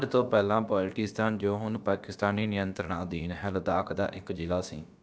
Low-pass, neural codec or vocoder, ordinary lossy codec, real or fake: none; codec, 16 kHz, about 1 kbps, DyCAST, with the encoder's durations; none; fake